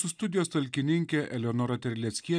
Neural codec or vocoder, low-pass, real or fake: none; 9.9 kHz; real